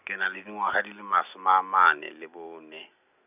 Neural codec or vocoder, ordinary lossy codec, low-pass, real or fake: none; none; 3.6 kHz; real